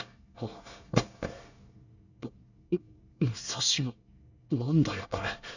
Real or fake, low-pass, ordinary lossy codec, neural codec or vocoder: fake; 7.2 kHz; none; codec, 24 kHz, 1 kbps, SNAC